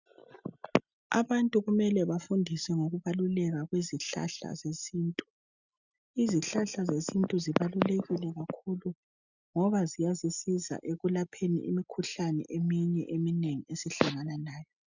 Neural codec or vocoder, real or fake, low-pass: none; real; 7.2 kHz